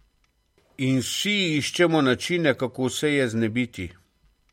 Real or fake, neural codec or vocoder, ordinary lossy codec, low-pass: real; none; MP3, 64 kbps; 19.8 kHz